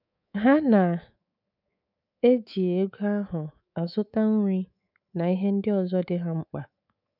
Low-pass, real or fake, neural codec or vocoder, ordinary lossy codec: 5.4 kHz; fake; codec, 24 kHz, 3.1 kbps, DualCodec; none